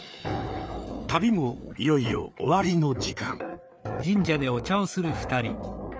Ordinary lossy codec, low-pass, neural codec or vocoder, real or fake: none; none; codec, 16 kHz, 4 kbps, FreqCodec, larger model; fake